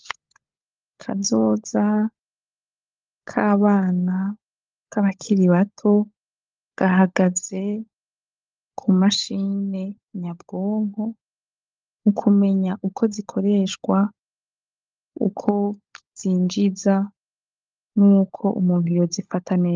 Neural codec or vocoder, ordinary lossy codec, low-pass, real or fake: codec, 16 kHz, 16 kbps, FunCodec, trained on LibriTTS, 50 frames a second; Opus, 24 kbps; 7.2 kHz; fake